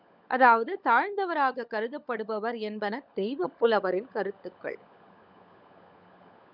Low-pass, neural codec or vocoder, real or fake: 5.4 kHz; codec, 16 kHz, 16 kbps, FunCodec, trained on LibriTTS, 50 frames a second; fake